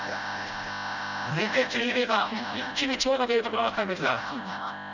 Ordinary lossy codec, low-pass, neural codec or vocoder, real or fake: none; 7.2 kHz; codec, 16 kHz, 0.5 kbps, FreqCodec, smaller model; fake